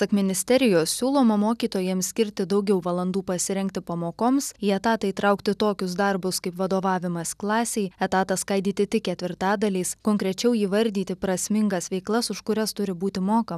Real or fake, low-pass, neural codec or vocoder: real; 14.4 kHz; none